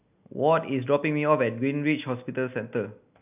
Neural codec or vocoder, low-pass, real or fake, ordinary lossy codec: none; 3.6 kHz; real; none